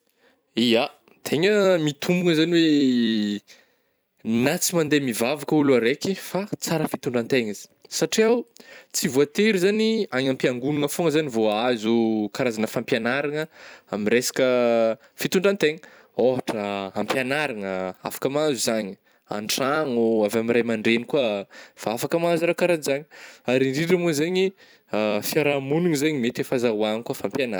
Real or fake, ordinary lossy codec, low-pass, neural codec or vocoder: fake; none; none; vocoder, 44.1 kHz, 128 mel bands every 256 samples, BigVGAN v2